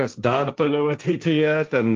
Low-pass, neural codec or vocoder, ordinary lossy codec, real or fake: 7.2 kHz; codec, 16 kHz, 1.1 kbps, Voila-Tokenizer; Opus, 24 kbps; fake